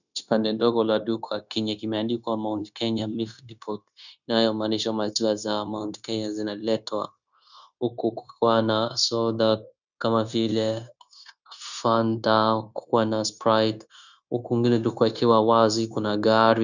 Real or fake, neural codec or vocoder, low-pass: fake; codec, 16 kHz, 0.9 kbps, LongCat-Audio-Codec; 7.2 kHz